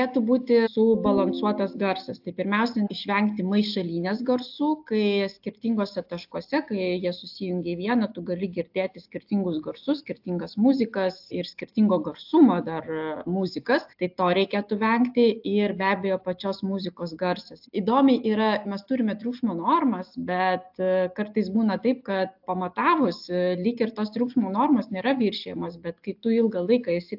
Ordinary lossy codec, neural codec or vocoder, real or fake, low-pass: AAC, 48 kbps; none; real; 5.4 kHz